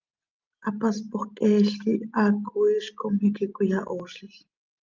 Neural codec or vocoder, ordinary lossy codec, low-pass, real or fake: none; Opus, 24 kbps; 7.2 kHz; real